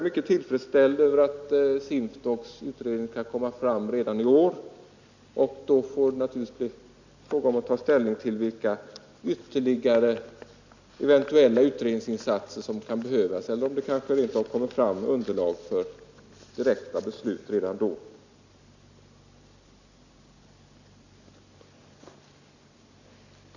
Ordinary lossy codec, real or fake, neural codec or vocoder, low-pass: none; real; none; 7.2 kHz